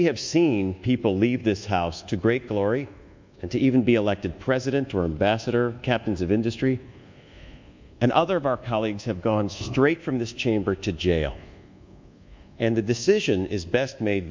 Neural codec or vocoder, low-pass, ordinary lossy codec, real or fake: codec, 24 kHz, 1.2 kbps, DualCodec; 7.2 kHz; MP3, 64 kbps; fake